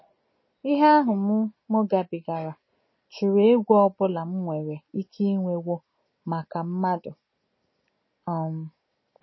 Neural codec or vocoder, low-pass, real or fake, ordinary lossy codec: none; 7.2 kHz; real; MP3, 24 kbps